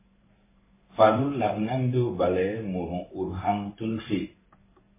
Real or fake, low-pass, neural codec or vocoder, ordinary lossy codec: fake; 3.6 kHz; codec, 16 kHz in and 24 kHz out, 1 kbps, XY-Tokenizer; MP3, 16 kbps